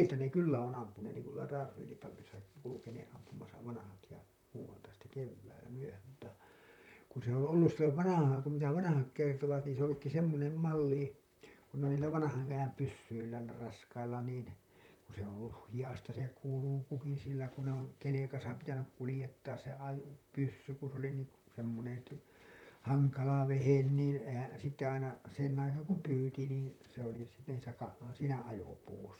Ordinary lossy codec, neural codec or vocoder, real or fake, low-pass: none; vocoder, 44.1 kHz, 128 mel bands, Pupu-Vocoder; fake; 19.8 kHz